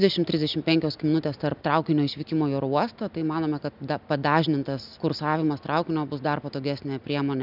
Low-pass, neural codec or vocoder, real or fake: 5.4 kHz; none; real